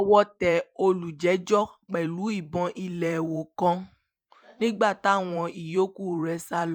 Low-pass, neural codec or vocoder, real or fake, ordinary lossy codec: 19.8 kHz; vocoder, 44.1 kHz, 128 mel bands every 512 samples, BigVGAN v2; fake; none